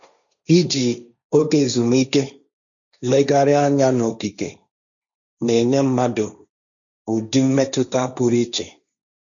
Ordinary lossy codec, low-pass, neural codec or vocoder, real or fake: none; 7.2 kHz; codec, 16 kHz, 1.1 kbps, Voila-Tokenizer; fake